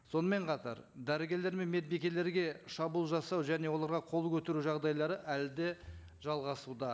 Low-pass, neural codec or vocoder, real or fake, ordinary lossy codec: none; none; real; none